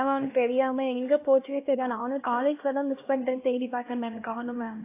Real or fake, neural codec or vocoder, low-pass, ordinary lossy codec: fake; codec, 16 kHz, 1 kbps, X-Codec, HuBERT features, trained on LibriSpeech; 3.6 kHz; AAC, 24 kbps